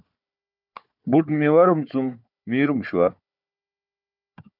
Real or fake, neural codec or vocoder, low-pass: fake; codec, 16 kHz, 4 kbps, FunCodec, trained on Chinese and English, 50 frames a second; 5.4 kHz